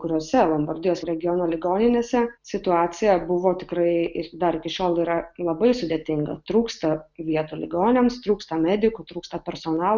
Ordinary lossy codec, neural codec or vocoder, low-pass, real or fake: Opus, 64 kbps; none; 7.2 kHz; real